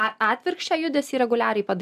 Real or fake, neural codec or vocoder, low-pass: real; none; 14.4 kHz